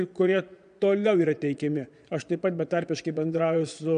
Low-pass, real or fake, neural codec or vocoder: 9.9 kHz; fake; vocoder, 22.05 kHz, 80 mel bands, Vocos